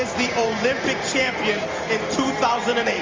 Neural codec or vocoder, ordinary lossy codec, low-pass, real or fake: none; Opus, 32 kbps; 7.2 kHz; real